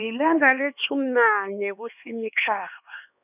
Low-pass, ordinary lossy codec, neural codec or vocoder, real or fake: 3.6 kHz; none; codec, 16 kHz, 2 kbps, X-Codec, HuBERT features, trained on LibriSpeech; fake